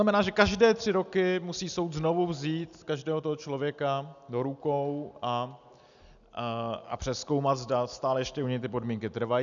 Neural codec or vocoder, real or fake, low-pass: none; real; 7.2 kHz